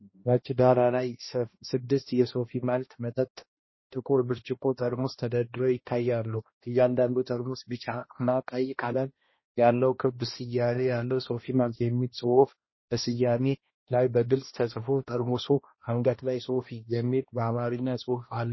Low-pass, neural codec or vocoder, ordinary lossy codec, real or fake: 7.2 kHz; codec, 16 kHz, 1 kbps, X-Codec, HuBERT features, trained on balanced general audio; MP3, 24 kbps; fake